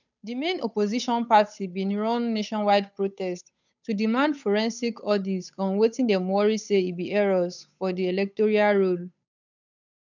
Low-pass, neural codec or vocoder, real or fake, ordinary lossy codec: 7.2 kHz; codec, 16 kHz, 8 kbps, FunCodec, trained on Chinese and English, 25 frames a second; fake; none